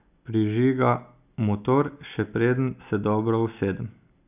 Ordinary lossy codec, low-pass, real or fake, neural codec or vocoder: none; 3.6 kHz; real; none